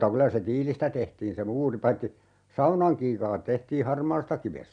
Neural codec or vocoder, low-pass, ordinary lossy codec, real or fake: none; 9.9 kHz; none; real